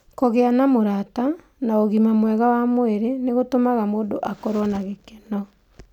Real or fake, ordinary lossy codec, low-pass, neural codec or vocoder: real; none; 19.8 kHz; none